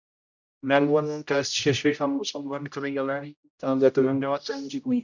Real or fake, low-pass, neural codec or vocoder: fake; 7.2 kHz; codec, 16 kHz, 0.5 kbps, X-Codec, HuBERT features, trained on general audio